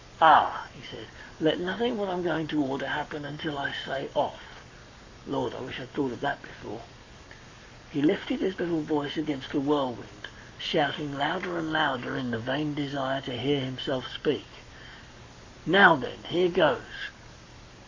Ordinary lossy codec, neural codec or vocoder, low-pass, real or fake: AAC, 48 kbps; codec, 44.1 kHz, 7.8 kbps, Pupu-Codec; 7.2 kHz; fake